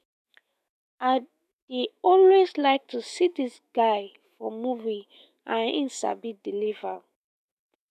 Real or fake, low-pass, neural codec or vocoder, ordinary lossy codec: fake; 14.4 kHz; autoencoder, 48 kHz, 128 numbers a frame, DAC-VAE, trained on Japanese speech; none